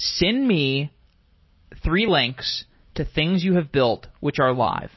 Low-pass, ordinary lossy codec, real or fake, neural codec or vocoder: 7.2 kHz; MP3, 24 kbps; real; none